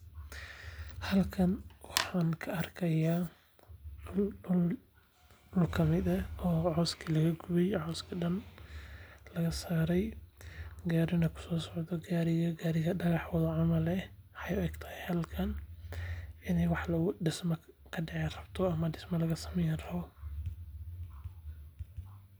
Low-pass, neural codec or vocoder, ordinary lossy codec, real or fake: none; none; none; real